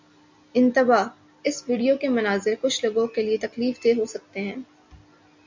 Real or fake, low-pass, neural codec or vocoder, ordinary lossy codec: real; 7.2 kHz; none; MP3, 64 kbps